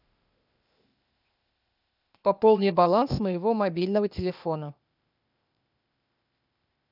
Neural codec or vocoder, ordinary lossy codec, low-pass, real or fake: codec, 16 kHz, 0.8 kbps, ZipCodec; none; 5.4 kHz; fake